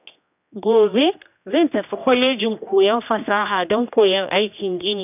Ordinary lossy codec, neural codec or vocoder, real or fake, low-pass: none; codec, 16 kHz, 1 kbps, X-Codec, HuBERT features, trained on general audio; fake; 3.6 kHz